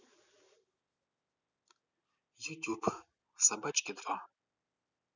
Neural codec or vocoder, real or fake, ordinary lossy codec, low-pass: none; real; none; 7.2 kHz